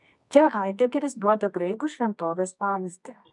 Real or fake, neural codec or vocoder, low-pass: fake; codec, 24 kHz, 0.9 kbps, WavTokenizer, medium music audio release; 10.8 kHz